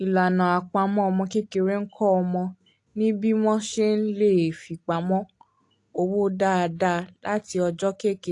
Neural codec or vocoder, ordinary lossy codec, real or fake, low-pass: none; AAC, 48 kbps; real; 10.8 kHz